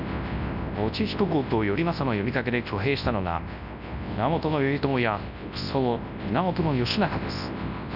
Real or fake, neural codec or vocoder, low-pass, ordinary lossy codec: fake; codec, 24 kHz, 0.9 kbps, WavTokenizer, large speech release; 5.4 kHz; none